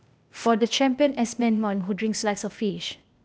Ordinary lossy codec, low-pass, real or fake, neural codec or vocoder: none; none; fake; codec, 16 kHz, 0.8 kbps, ZipCodec